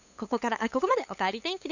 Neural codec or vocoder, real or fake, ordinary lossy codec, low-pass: codec, 16 kHz, 8 kbps, FunCodec, trained on LibriTTS, 25 frames a second; fake; none; 7.2 kHz